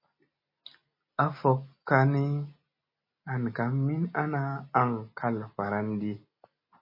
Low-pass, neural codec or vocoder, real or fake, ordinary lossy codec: 5.4 kHz; none; real; MP3, 24 kbps